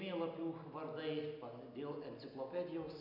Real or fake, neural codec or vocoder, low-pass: real; none; 5.4 kHz